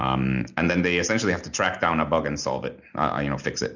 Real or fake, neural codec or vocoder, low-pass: real; none; 7.2 kHz